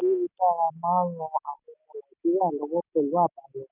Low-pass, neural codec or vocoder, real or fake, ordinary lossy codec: 3.6 kHz; none; real; none